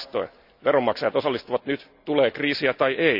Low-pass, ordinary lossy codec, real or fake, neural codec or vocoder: 5.4 kHz; none; real; none